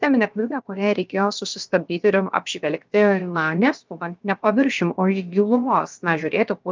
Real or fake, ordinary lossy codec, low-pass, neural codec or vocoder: fake; Opus, 24 kbps; 7.2 kHz; codec, 16 kHz, about 1 kbps, DyCAST, with the encoder's durations